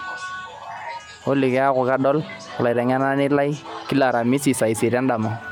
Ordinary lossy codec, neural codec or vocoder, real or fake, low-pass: none; none; real; 19.8 kHz